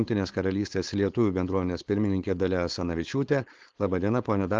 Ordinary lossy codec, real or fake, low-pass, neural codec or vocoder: Opus, 16 kbps; fake; 7.2 kHz; codec, 16 kHz, 4.8 kbps, FACodec